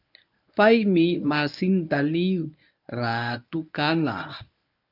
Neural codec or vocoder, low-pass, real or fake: codec, 24 kHz, 0.9 kbps, WavTokenizer, medium speech release version 1; 5.4 kHz; fake